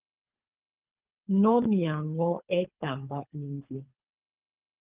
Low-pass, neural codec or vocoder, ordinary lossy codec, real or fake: 3.6 kHz; codec, 24 kHz, 3 kbps, HILCodec; Opus, 24 kbps; fake